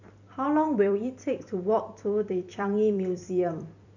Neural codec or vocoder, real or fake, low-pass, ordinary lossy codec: vocoder, 44.1 kHz, 128 mel bands every 256 samples, BigVGAN v2; fake; 7.2 kHz; none